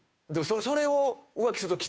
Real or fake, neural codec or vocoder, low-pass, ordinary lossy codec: fake; codec, 16 kHz, 2 kbps, FunCodec, trained on Chinese and English, 25 frames a second; none; none